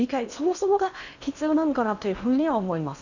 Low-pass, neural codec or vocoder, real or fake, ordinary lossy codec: 7.2 kHz; codec, 16 kHz in and 24 kHz out, 0.8 kbps, FocalCodec, streaming, 65536 codes; fake; none